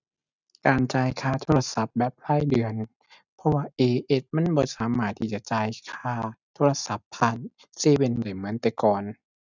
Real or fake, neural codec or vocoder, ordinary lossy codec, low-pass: real; none; none; 7.2 kHz